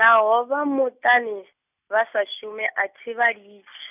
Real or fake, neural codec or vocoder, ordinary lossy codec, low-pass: real; none; none; 3.6 kHz